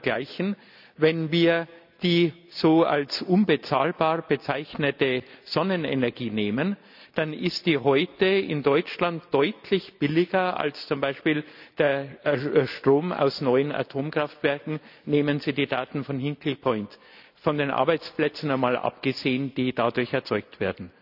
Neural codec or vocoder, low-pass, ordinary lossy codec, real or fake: none; 5.4 kHz; none; real